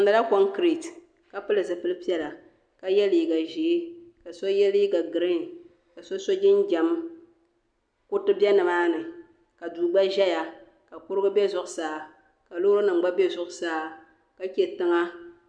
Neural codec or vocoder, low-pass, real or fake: none; 9.9 kHz; real